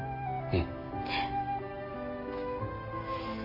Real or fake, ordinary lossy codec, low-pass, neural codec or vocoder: real; none; 5.4 kHz; none